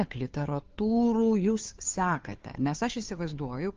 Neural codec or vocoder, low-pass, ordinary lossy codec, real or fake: codec, 16 kHz, 2 kbps, FunCodec, trained on Chinese and English, 25 frames a second; 7.2 kHz; Opus, 24 kbps; fake